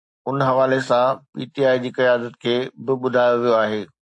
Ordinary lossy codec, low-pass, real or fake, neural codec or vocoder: AAC, 64 kbps; 10.8 kHz; real; none